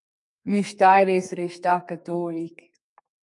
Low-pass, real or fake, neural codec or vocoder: 10.8 kHz; fake; codec, 32 kHz, 1.9 kbps, SNAC